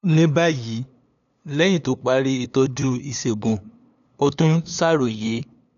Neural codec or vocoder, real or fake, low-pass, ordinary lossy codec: codec, 16 kHz, 2 kbps, FunCodec, trained on LibriTTS, 25 frames a second; fake; 7.2 kHz; none